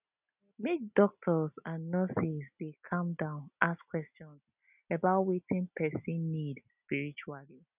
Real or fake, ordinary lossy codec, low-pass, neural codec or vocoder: real; none; 3.6 kHz; none